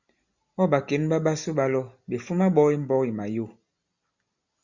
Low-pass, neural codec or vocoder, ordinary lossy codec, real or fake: 7.2 kHz; none; Opus, 64 kbps; real